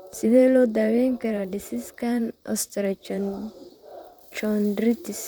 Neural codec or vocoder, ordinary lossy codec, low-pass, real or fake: vocoder, 44.1 kHz, 128 mel bands, Pupu-Vocoder; none; none; fake